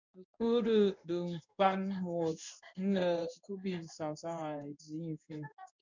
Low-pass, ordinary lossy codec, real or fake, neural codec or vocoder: 7.2 kHz; MP3, 64 kbps; fake; codec, 16 kHz in and 24 kHz out, 1 kbps, XY-Tokenizer